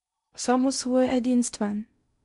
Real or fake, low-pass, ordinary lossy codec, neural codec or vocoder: fake; 10.8 kHz; none; codec, 16 kHz in and 24 kHz out, 0.6 kbps, FocalCodec, streaming, 2048 codes